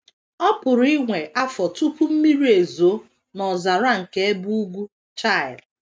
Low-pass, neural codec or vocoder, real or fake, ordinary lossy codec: none; none; real; none